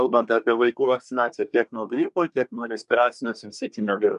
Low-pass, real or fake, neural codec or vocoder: 10.8 kHz; fake; codec, 24 kHz, 1 kbps, SNAC